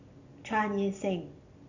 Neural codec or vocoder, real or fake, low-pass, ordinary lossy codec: none; real; 7.2 kHz; none